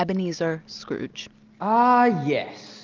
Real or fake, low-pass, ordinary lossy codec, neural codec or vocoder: real; 7.2 kHz; Opus, 32 kbps; none